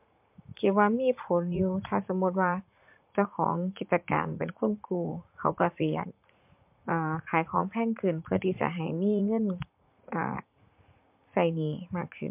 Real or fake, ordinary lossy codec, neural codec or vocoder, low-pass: fake; MP3, 32 kbps; vocoder, 44.1 kHz, 80 mel bands, Vocos; 3.6 kHz